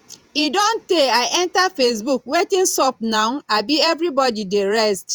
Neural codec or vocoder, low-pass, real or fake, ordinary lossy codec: vocoder, 48 kHz, 128 mel bands, Vocos; 19.8 kHz; fake; Opus, 64 kbps